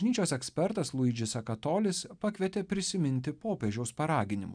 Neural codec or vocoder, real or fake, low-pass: none; real; 9.9 kHz